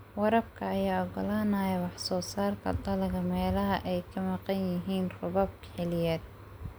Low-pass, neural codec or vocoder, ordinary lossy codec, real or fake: none; none; none; real